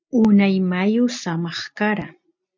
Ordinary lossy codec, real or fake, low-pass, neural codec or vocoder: MP3, 64 kbps; real; 7.2 kHz; none